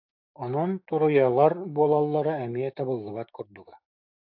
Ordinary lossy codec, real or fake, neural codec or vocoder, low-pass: AAC, 48 kbps; fake; codec, 16 kHz, 6 kbps, DAC; 5.4 kHz